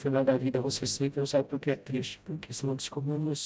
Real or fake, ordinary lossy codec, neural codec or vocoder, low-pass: fake; none; codec, 16 kHz, 0.5 kbps, FreqCodec, smaller model; none